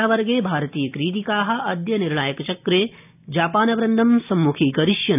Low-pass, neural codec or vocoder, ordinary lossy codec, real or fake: 3.6 kHz; none; none; real